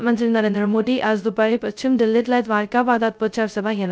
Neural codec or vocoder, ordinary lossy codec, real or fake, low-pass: codec, 16 kHz, 0.2 kbps, FocalCodec; none; fake; none